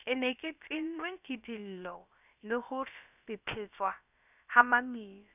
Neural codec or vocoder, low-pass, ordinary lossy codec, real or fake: codec, 16 kHz, about 1 kbps, DyCAST, with the encoder's durations; 3.6 kHz; none; fake